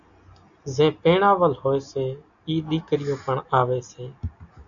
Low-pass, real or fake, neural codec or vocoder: 7.2 kHz; real; none